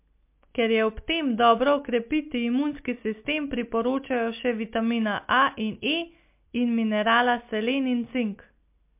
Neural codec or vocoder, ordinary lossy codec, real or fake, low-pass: none; MP3, 32 kbps; real; 3.6 kHz